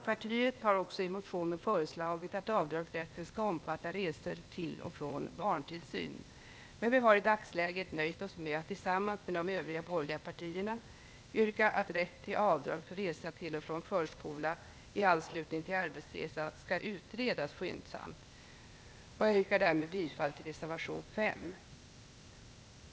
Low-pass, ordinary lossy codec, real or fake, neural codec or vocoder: none; none; fake; codec, 16 kHz, 0.8 kbps, ZipCodec